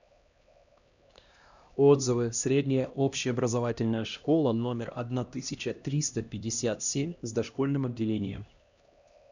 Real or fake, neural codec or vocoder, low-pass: fake; codec, 16 kHz, 1 kbps, X-Codec, HuBERT features, trained on LibriSpeech; 7.2 kHz